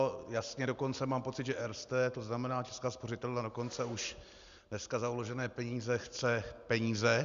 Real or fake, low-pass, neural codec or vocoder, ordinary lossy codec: real; 7.2 kHz; none; Opus, 64 kbps